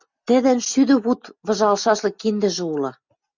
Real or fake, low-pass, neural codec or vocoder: real; 7.2 kHz; none